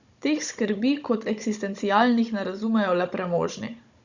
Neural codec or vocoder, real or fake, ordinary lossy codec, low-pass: codec, 16 kHz, 16 kbps, FunCodec, trained on Chinese and English, 50 frames a second; fake; Opus, 64 kbps; 7.2 kHz